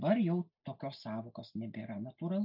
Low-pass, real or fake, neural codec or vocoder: 5.4 kHz; real; none